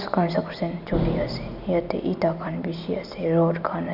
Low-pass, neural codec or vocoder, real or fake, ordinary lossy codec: 5.4 kHz; none; real; none